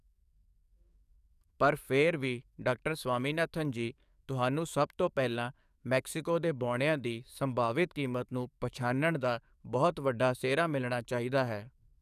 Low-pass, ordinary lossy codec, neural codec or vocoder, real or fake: 14.4 kHz; none; codec, 44.1 kHz, 7.8 kbps, DAC; fake